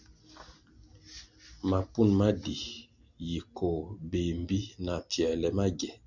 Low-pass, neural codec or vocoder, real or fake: 7.2 kHz; none; real